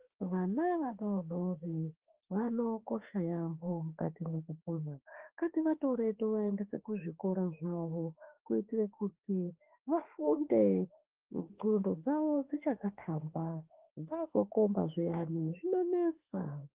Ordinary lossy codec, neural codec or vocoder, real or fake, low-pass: Opus, 16 kbps; autoencoder, 48 kHz, 32 numbers a frame, DAC-VAE, trained on Japanese speech; fake; 3.6 kHz